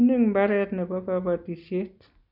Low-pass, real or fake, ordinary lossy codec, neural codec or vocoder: 5.4 kHz; real; none; none